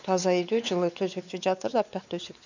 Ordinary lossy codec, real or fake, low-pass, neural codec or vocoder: none; fake; 7.2 kHz; codec, 16 kHz, 4 kbps, X-Codec, WavLM features, trained on Multilingual LibriSpeech